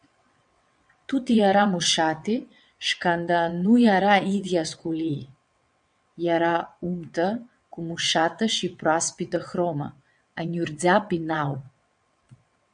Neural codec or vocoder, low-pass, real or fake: vocoder, 22.05 kHz, 80 mel bands, WaveNeXt; 9.9 kHz; fake